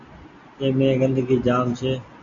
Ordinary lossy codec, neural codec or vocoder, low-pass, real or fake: Opus, 64 kbps; none; 7.2 kHz; real